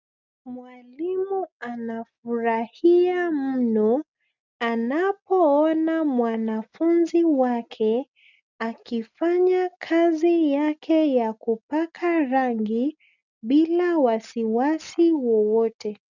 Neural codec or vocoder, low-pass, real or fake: none; 7.2 kHz; real